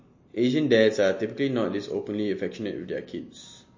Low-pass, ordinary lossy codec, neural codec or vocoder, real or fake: 7.2 kHz; MP3, 32 kbps; none; real